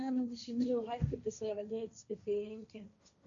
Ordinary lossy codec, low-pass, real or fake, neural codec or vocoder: none; 7.2 kHz; fake; codec, 16 kHz, 1.1 kbps, Voila-Tokenizer